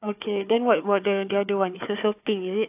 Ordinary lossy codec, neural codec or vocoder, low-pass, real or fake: AAC, 32 kbps; codec, 16 kHz, 4 kbps, FunCodec, trained on Chinese and English, 50 frames a second; 3.6 kHz; fake